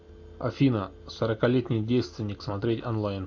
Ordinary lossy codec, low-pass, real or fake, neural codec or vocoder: AAC, 48 kbps; 7.2 kHz; real; none